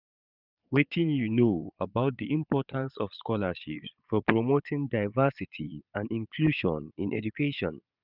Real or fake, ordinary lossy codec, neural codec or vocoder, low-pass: fake; none; vocoder, 22.05 kHz, 80 mel bands, Vocos; 5.4 kHz